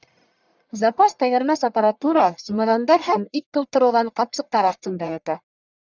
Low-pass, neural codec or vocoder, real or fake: 7.2 kHz; codec, 44.1 kHz, 1.7 kbps, Pupu-Codec; fake